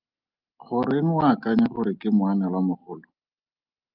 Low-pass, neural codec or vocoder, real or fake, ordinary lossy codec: 5.4 kHz; none; real; Opus, 24 kbps